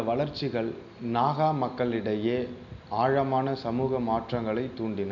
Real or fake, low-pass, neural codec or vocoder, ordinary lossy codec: real; 7.2 kHz; none; none